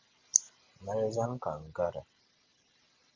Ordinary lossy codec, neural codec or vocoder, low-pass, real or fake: Opus, 32 kbps; none; 7.2 kHz; real